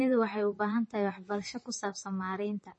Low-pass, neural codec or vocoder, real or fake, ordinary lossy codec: 10.8 kHz; vocoder, 44.1 kHz, 128 mel bands, Pupu-Vocoder; fake; MP3, 32 kbps